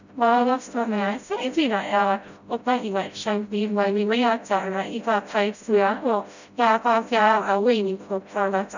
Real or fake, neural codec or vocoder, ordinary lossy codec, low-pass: fake; codec, 16 kHz, 0.5 kbps, FreqCodec, smaller model; none; 7.2 kHz